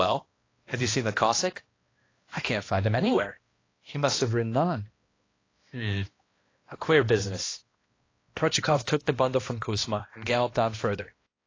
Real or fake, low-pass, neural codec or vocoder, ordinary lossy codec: fake; 7.2 kHz; codec, 16 kHz, 1 kbps, X-Codec, HuBERT features, trained on balanced general audio; AAC, 32 kbps